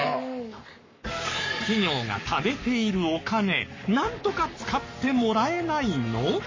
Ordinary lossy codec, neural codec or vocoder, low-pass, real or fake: MP3, 32 kbps; codec, 44.1 kHz, 7.8 kbps, Pupu-Codec; 7.2 kHz; fake